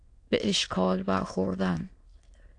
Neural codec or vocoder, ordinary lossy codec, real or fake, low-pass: autoencoder, 22.05 kHz, a latent of 192 numbers a frame, VITS, trained on many speakers; AAC, 48 kbps; fake; 9.9 kHz